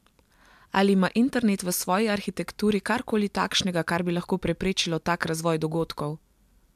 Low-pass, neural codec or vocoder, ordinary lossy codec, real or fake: 14.4 kHz; none; MP3, 96 kbps; real